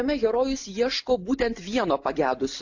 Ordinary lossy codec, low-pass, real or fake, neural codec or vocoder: AAC, 48 kbps; 7.2 kHz; real; none